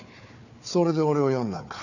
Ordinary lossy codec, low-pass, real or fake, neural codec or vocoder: none; 7.2 kHz; fake; codec, 16 kHz, 4 kbps, FunCodec, trained on Chinese and English, 50 frames a second